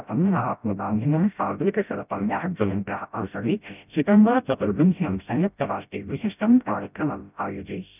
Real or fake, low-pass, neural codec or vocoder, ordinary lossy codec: fake; 3.6 kHz; codec, 16 kHz, 0.5 kbps, FreqCodec, smaller model; none